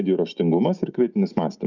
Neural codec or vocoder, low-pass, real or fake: none; 7.2 kHz; real